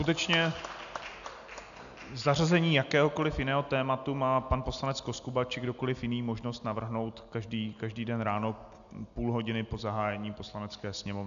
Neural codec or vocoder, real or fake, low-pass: none; real; 7.2 kHz